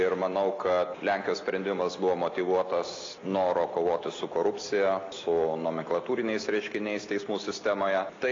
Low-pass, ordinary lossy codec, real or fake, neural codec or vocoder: 7.2 kHz; AAC, 32 kbps; real; none